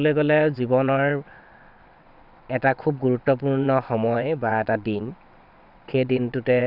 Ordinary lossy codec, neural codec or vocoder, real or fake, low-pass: none; vocoder, 22.05 kHz, 80 mel bands, WaveNeXt; fake; 5.4 kHz